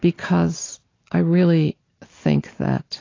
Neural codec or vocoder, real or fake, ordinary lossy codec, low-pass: none; real; AAC, 32 kbps; 7.2 kHz